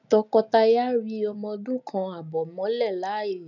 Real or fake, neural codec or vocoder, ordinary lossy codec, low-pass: real; none; none; 7.2 kHz